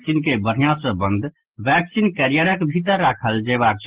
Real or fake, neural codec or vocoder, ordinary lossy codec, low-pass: real; none; Opus, 16 kbps; 3.6 kHz